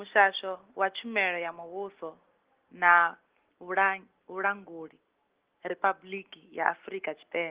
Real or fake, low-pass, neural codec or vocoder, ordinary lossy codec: real; 3.6 kHz; none; Opus, 16 kbps